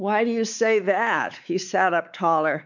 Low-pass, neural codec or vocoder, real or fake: 7.2 kHz; codec, 16 kHz, 4 kbps, X-Codec, WavLM features, trained on Multilingual LibriSpeech; fake